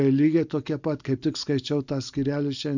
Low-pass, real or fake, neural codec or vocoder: 7.2 kHz; real; none